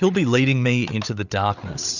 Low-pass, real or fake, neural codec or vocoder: 7.2 kHz; fake; codec, 16 kHz, 8 kbps, FreqCodec, larger model